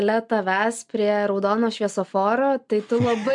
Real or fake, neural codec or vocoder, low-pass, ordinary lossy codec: real; none; 10.8 kHz; MP3, 64 kbps